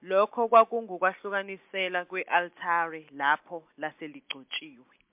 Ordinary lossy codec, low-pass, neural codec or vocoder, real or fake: MP3, 32 kbps; 3.6 kHz; none; real